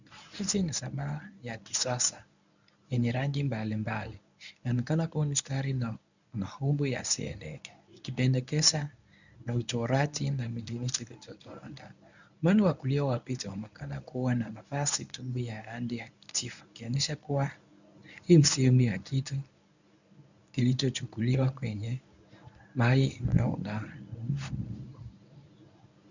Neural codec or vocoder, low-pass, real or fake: codec, 24 kHz, 0.9 kbps, WavTokenizer, medium speech release version 1; 7.2 kHz; fake